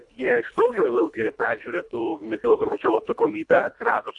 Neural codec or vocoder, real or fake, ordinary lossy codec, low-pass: codec, 24 kHz, 1.5 kbps, HILCodec; fake; AAC, 64 kbps; 10.8 kHz